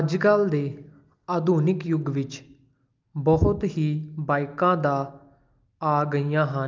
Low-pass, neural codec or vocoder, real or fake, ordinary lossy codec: 7.2 kHz; none; real; Opus, 32 kbps